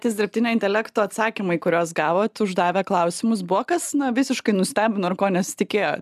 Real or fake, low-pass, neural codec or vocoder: real; 14.4 kHz; none